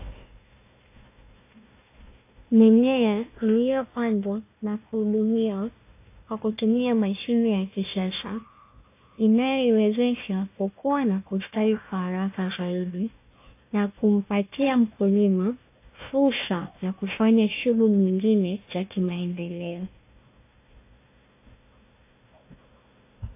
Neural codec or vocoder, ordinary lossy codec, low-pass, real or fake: codec, 16 kHz, 1 kbps, FunCodec, trained on Chinese and English, 50 frames a second; AAC, 24 kbps; 3.6 kHz; fake